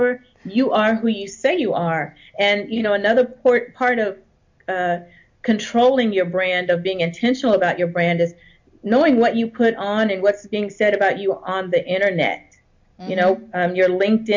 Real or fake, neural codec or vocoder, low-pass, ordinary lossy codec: real; none; 7.2 kHz; MP3, 48 kbps